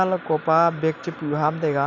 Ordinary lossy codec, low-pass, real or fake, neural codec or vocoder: none; 7.2 kHz; real; none